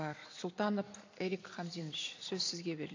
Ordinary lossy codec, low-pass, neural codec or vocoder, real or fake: AAC, 48 kbps; 7.2 kHz; vocoder, 44.1 kHz, 128 mel bands every 256 samples, BigVGAN v2; fake